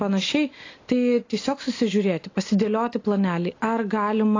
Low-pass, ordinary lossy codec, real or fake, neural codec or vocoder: 7.2 kHz; AAC, 32 kbps; real; none